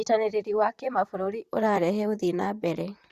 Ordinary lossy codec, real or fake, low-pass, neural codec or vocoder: Opus, 64 kbps; fake; 14.4 kHz; vocoder, 44.1 kHz, 128 mel bands, Pupu-Vocoder